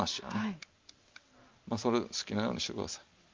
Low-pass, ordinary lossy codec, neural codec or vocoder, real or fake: 7.2 kHz; Opus, 32 kbps; none; real